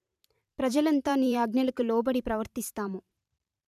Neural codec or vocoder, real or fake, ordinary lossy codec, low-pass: vocoder, 44.1 kHz, 128 mel bands every 512 samples, BigVGAN v2; fake; none; 14.4 kHz